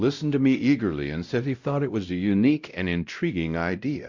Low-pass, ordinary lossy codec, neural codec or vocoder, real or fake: 7.2 kHz; Opus, 64 kbps; codec, 16 kHz, 0.5 kbps, X-Codec, WavLM features, trained on Multilingual LibriSpeech; fake